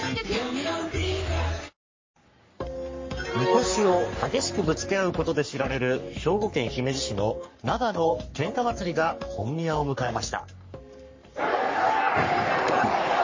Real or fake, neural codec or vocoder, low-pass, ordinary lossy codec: fake; codec, 44.1 kHz, 3.4 kbps, Pupu-Codec; 7.2 kHz; MP3, 32 kbps